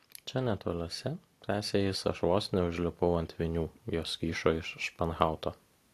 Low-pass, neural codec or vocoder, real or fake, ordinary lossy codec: 14.4 kHz; none; real; AAC, 64 kbps